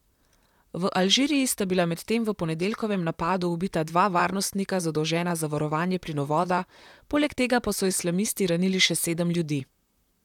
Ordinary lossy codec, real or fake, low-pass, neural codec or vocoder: none; fake; 19.8 kHz; vocoder, 44.1 kHz, 128 mel bands, Pupu-Vocoder